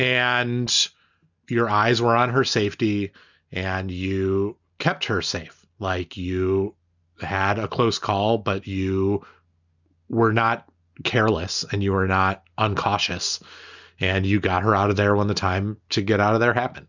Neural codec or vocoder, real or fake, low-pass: none; real; 7.2 kHz